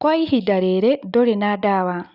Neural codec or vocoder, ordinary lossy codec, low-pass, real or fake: none; Opus, 64 kbps; 5.4 kHz; real